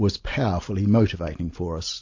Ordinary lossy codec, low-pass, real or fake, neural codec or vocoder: AAC, 48 kbps; 7.2 kHz; real; none